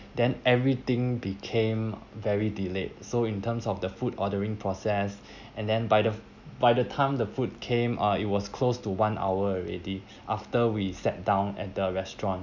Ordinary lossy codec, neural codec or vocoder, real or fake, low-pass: none; none; real; 7.2 kHz